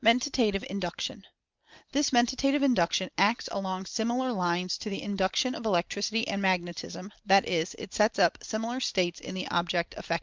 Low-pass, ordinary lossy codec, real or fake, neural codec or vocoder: 7.2 kHz; Opus, 24 kbps; real; none